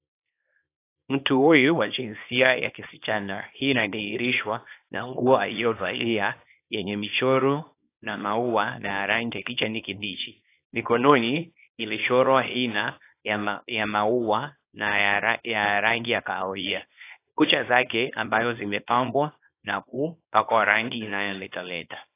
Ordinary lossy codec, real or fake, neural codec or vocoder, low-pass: AAC, 24 kbps; fake; codec, 24 kHz, 0.9 kbps, WavTokenizer, small release; 3.6 kHz